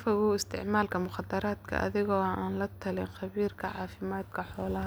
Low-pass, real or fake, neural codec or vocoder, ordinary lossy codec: none; real; none; none